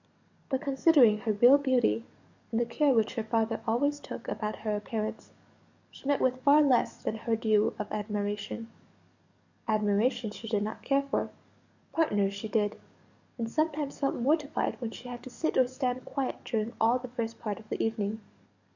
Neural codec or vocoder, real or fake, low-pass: codec, 44.1 kHz, 7.8 kbps, DAC; fake; 7.2 kHz